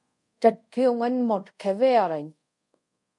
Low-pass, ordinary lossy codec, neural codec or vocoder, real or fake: 10.8 kHz; MP3, 64 kbps; codec, 24 kHz, 0.5 kbps, DualCodec; fake